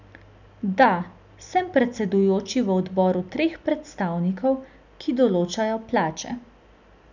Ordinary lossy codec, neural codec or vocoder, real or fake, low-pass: none; none; real; 7.2 kHz